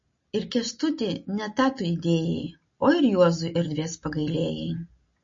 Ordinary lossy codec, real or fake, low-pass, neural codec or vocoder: MP3, 32 kbps; real; 7.2 kHz; none